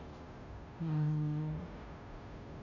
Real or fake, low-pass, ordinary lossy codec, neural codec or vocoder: fake; 7.2 kHz; none; codec, 16 kHz, 0.5 kbps, FunCodec, trained on Chinese and English, 25 frames a second